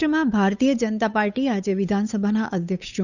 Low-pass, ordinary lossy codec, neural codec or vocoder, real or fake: 7.2 kHz; none; codec, 16 kHz, 8 kbps, FunCodec, trained on Chinese and English, 25 frames a second; fake